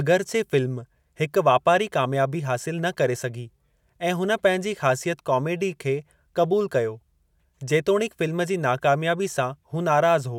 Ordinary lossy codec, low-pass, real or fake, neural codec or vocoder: none; 19.8 kHz; real; none